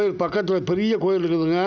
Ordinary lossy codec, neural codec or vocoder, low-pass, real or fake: none; none; none; real